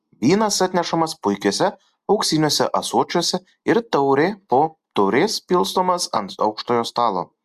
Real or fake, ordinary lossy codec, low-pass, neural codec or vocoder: real; Opus, 64 kbps; 14.4 kHz; none